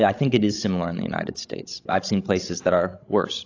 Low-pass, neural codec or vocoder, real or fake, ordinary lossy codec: 7.2 kHz; codec, 16 kHz, 16 kbps, FreqCodec, larger model; fake; AAC, 48 kbps